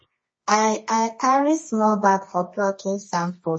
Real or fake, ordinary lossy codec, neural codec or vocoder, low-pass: fake; MP3, 32 kbps; codec, 24 kHz, 0.9 kbps, WavTokenizer, medium music audio release; 9.9 kHz